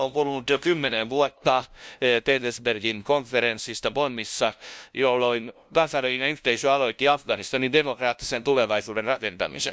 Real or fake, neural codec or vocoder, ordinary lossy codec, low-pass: fake; codec, 16 kHz, 0.5 kbps, FunCodec, trained on LibriTTS, 25 frames a second; none; none